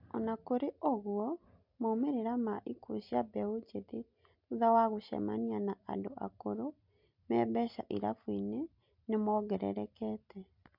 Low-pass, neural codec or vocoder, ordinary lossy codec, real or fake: 5.4 kHz; none; MP3, 48 kbps; real